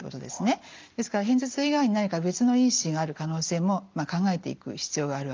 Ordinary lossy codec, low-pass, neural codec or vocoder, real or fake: Opus, 24 kbps; 7.2 kHz; none; real